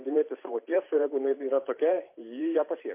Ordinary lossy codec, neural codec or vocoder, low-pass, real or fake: MP3, 24 kbps; vocoder, 44.1 kHz, 128 mel bands every 512 samples, BigVGAN v2; 3.6 kHz; fake